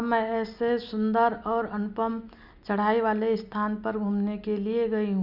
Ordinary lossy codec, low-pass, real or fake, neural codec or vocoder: none; 5.4 kHz; real; none